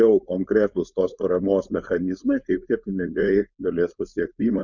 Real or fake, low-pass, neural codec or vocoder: fake; 7.2 kHz; codec, 16 kHz, 4.8 kbps, FACodec